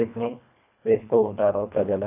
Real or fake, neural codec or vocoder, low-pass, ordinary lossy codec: fake; codec, 24 kHz, 1.5 kbps, HILCodec; 3.6 kHz; none